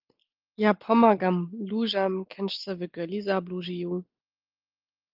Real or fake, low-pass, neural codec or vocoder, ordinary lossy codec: real; 5.4 kHz; none; Opus, 32 kbps